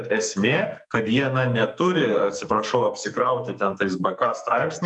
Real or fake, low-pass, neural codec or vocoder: fake; 10.8 kHz; codec, 44.1 kHz, 2.6 kbps, SNAC